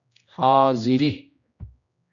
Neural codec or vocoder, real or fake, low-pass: codec, 16 kHz, 1 kbps, X-Codec, HuBERT features, trained on general audio; fake; 7.2 kHz